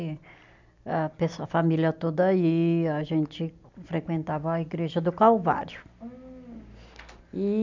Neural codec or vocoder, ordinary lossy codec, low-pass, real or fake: none; MP3, 64 kbps; 7.2 kHz; real